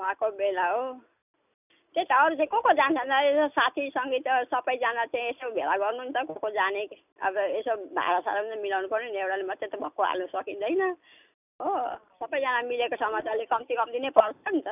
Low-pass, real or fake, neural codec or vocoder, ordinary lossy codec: 3.6 kHz; real; none; none